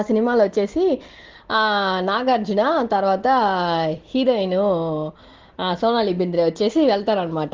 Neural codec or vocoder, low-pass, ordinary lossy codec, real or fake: none; 7.2 kHz; Opus, 16 kbps; real